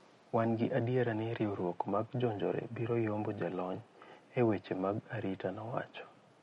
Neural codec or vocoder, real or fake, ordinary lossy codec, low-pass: none; real; MP3, 48 kbps; 19.8 kHz